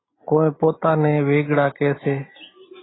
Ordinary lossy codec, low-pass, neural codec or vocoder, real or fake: AAC, 16 kbps; 7.2 kHz; none; real